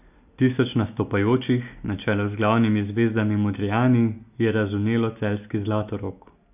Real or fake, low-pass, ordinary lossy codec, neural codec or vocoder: real; 3.6 kHz; none; none